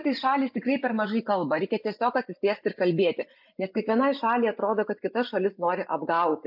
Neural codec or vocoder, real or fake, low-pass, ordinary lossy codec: none; real; 5.4 kHz; MP3, 48 kbps